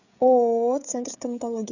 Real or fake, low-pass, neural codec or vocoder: fake; 7.2 kHz; codec, 16 kHz, 16 kbps, FreqCodec, smaller model